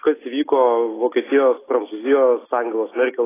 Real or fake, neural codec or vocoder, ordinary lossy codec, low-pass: real; none; AAC, 16 kbps; 3.6 kHz